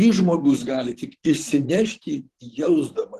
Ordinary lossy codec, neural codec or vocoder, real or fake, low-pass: Opus, 16 kbps; vocoder, 44.1 kHz, 128 mel bands, Pupu-Vocoder; fake; 14.4 kHz